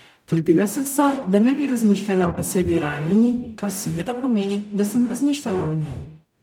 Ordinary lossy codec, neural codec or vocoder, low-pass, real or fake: none; codec, 44.1 kHz, 0.9 kbps, DAC; 19.8 kHz; fake